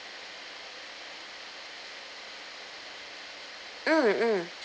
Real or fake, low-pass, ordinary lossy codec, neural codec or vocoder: real; none; none; none